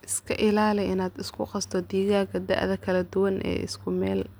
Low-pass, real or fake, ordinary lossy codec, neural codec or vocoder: none; real; none; none